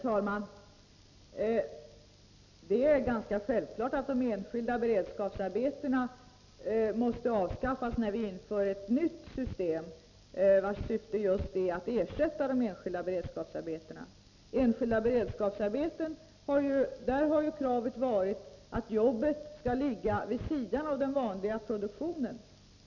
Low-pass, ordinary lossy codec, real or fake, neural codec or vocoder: 7.2 kHz; none; real; none